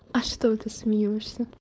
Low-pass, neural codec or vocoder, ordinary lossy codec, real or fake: none; codec, 16 kHz, 4.8 kbps, FACodec; none; fake